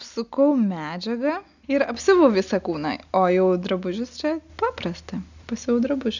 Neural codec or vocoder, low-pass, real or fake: none; 7.2 kHz; real